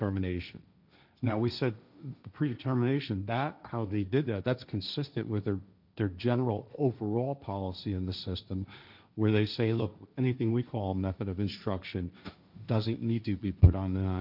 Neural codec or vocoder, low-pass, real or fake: codec, 16 kHz, 1.1 kbps, Voila-Tokenizer; 5.4 kHz; fake